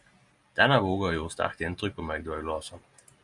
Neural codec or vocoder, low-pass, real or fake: none; 10.8 kHz; real